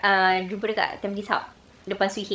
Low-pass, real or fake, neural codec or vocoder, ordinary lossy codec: none; fake; codec, 16 kHz, 16 kbps, FreqCodec, larger model; none